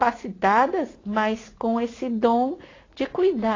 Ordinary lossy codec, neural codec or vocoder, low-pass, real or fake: AAC, 32 kbps; vocoder, 44.1 kHz, 80 mel bands, Vocos; 7.2 kHz; fake